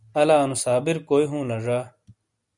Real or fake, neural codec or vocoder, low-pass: real; none; 10.8 kHz